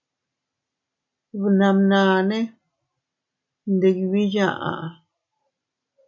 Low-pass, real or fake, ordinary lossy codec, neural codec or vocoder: 7.2 kHz; real; MP3, 64 kbps; none